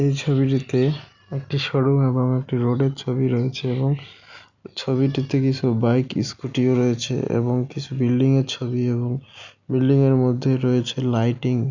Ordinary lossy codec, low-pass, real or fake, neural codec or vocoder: none; 7.2 kHz; real; none